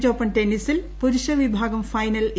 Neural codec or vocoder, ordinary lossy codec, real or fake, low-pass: none; none; real; none